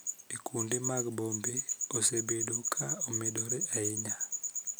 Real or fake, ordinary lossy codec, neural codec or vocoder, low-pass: real; none; none; none